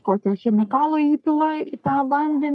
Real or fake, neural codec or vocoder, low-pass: fake; codec, 44.1 kHz, 3.4 kbps, Pupu-Codec; 10.8 kHz